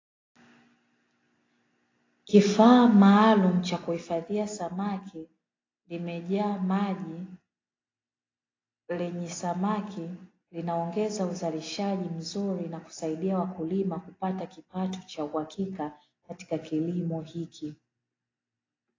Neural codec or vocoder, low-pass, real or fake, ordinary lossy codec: none; 7.2 kHz; real; AAC, 32 kbps